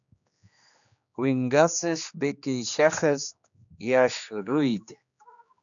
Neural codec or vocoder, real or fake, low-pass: codec, 16 kHz, 2 kbps, X-Codec, HuBERT features, trained on general audio; fake; 7.2 kHz